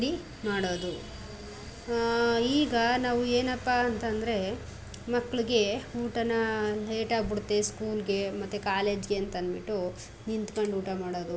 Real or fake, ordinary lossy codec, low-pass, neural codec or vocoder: real; none; none; none